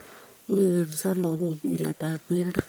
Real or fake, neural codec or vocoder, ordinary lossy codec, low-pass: fake; codec, 44.1 kHz, 1.7 kbps, Pupu-Codec; none; none